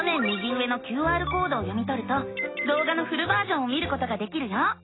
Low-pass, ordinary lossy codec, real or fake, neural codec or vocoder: 7.2 kHz; AAC, 16 kbps; real; none